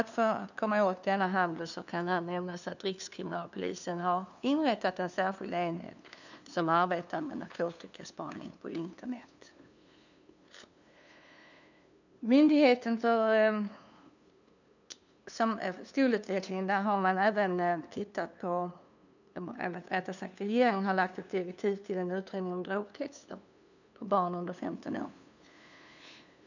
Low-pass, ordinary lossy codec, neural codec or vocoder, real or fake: 7.2 kHz; none; codec, 16 kHz, 2 kbps, FunCodec, trained on LibriTTS, 25 frames a second; fake